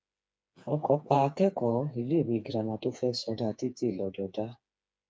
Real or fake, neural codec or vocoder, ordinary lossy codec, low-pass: fake; codec, 16 kHz, 4 kbps, FreqCodec, smaller model; none; none